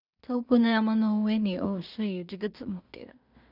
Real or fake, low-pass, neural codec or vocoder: fake; 5.4 kHz; codec, 16 kHz in and 24 kHz out, 0.4 kbps, LongCat-Audio-Codec, two codebook decoder